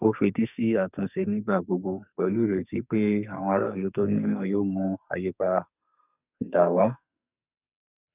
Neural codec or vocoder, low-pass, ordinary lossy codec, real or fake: codec, 44.1 kHz, 2.6 kbps, SNAC; 3.6 kHz; none; fake